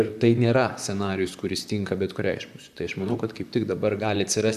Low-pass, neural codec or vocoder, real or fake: 14.4 kHz; vocoder, 44.1 kHz, 128 mel bands, Pupu-Vocoder; fake